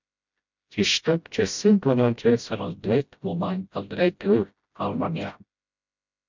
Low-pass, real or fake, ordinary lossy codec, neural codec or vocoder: 7.2 kHz; fake; MP3, 64 kbps; codec, 16 kHz, 0.5 kbps, FreqCodec, smaller model